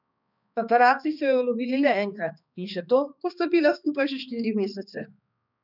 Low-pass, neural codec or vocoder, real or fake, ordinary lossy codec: 5.4 kHz; codec, 16 kHz, 2 kbps, X-Codec, HuBERT features, trained on balanced general audio; fake; none